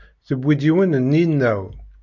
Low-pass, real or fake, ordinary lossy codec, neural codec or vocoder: 7.2 kHz; real; MP3, 48 kbps; none